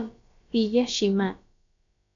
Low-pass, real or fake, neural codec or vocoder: 7.2 kHz; fake; codec, 16 kHz, about 1 kbps, DyCAST, with the encoder's durations